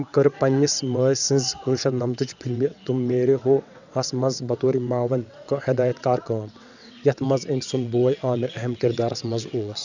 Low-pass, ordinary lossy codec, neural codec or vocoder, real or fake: 7.2 kHz; none; vocoder, 22.05 kHz, 80 mel bands, WaveNeXt; fake